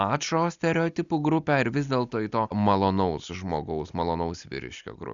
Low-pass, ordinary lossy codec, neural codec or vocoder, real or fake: 7.2 kHz; Opus, 64 kbps; none; real